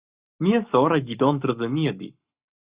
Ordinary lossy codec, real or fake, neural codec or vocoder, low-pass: Opus, 64 kbps; fake; codec, 44.1 kHz, 7.8 kbps, Pupu-Codec; 3.6 kHz